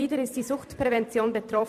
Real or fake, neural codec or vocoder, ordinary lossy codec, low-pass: fake; vocoder, 44.1 kHz, 128 mel bands every 256 samples, BigVGAN v2; AAC, 96 kbps; 14.4 kHz